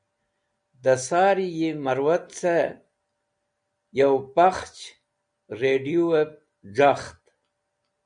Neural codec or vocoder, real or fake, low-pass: none; real; 9.9 kHz